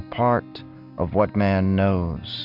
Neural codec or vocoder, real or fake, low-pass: none; real; 5.4 kHz